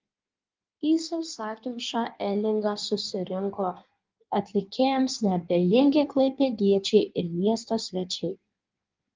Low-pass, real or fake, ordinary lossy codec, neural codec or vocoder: 7.2 kHz; fake; Opus, 32 kbps; codec, 16 kHz in and 24 kHz out, 1.1 kbps, FireRedTTS-2 codec